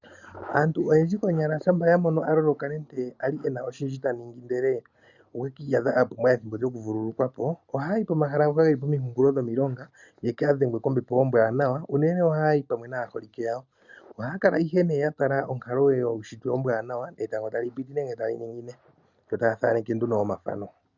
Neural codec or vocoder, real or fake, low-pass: none; real; 7.2 kHz